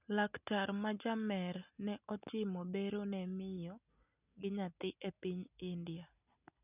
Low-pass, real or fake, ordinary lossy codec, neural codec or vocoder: 3.6 kHz; real; none; none